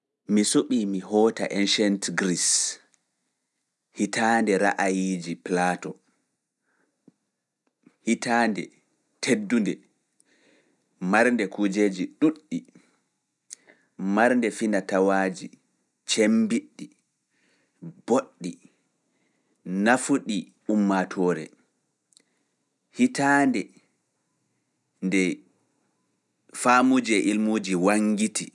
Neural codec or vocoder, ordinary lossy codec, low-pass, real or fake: none; none; none; real